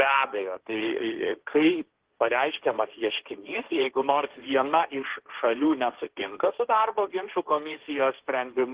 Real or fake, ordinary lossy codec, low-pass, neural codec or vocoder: fake; Opus, 16 kbps; 3.6 kHz; codec, 16 kHz, 1.1 kbps, Voila-Tokenizer